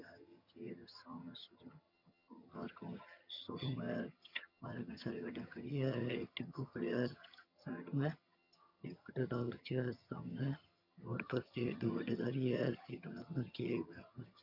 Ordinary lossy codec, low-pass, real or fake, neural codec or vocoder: none; 5.4 kHz; fake; vocoder, 22.05 kHz, 80 mel bands, HiFi-GAN